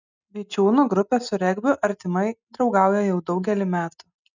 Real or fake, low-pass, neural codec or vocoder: real; 7.2 kHz; none